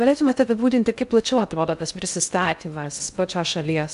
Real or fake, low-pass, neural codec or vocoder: fake; 10.8 kHz; codec, 16 kHz in and 24 kHz out, 0.6 kbps, FocalCodec, streaming, 2048 codes